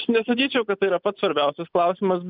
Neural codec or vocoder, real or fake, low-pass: none; real; 5.4 kHz